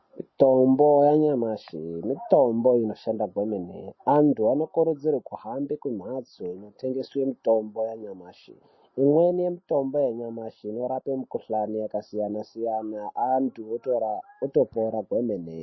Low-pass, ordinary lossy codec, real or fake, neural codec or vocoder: 7.2 kHz; MP3, 24 kbps; real; none